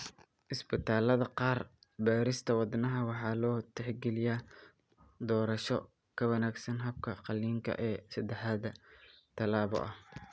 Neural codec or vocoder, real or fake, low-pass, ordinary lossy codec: none; real; none; none